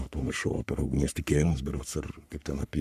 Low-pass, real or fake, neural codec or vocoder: 14.4 kHz; fake; codec, 44.1 kHz, 3.4 kbps, Pupu-Codec